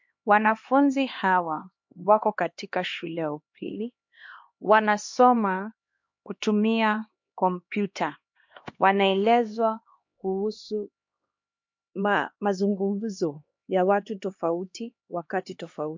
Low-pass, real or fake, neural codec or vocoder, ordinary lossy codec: 7.2 kHz; fake; codec, 16 kHz, 2 kbps, X-Codec, HuBERT features, trained on LibriSpeech; MP3, 48 kbps